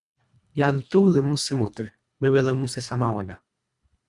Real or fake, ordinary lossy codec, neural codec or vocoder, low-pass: fake; Opus, 64 kbps; codec, 24 kHz, 1.5 kbps, HILCodec; 10.8 kHz